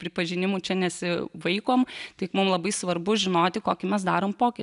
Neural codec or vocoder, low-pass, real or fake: none; 10.8 kHz; real